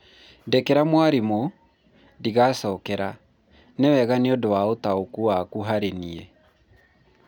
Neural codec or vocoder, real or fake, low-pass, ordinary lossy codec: none; real; 19.8 kHz; none